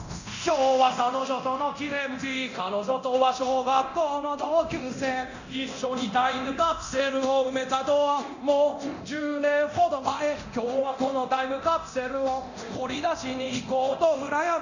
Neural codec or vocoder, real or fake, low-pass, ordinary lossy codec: codec, 24 kHz, 0.9 kbps, DualCodec; fake; 7.2 kHz; none